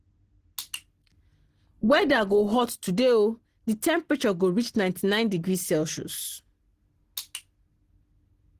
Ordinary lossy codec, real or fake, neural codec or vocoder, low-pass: Opus, 16 kbps; real; none; 14.4 kHz